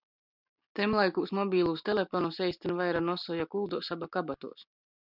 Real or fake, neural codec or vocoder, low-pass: real; none; 5.4 kHz